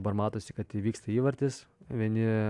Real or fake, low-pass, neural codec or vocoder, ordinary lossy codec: real; 10.8 kHz; none; AAC, 64 kbps